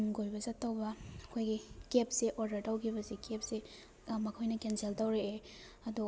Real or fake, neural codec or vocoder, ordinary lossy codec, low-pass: real; none; none; none